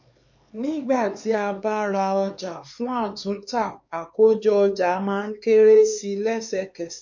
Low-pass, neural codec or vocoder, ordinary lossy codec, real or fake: 7.2 kHz; codec, 16 kHz, 4 kbps, X-Codec, HuBERT features, trained on LibriSpeech; MP3, 48 kbps; fake